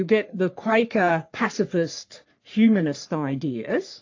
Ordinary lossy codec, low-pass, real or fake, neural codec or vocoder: AAC, 48 kbps; 7.2 kHz; fake; codec, 44.1 kHz, 3.4 kbps, Pupu-Codec